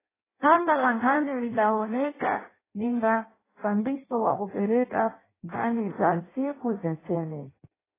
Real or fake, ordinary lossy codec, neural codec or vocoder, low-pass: fake; AAC, 16 kbps; codec, 16 kHz in and 24 kHz out, 0.6 kbps, FireRedTTS-2 codec; 3.6 kHz